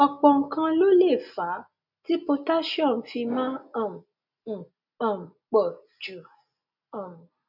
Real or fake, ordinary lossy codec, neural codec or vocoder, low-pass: real; none; none; 5.4 kHz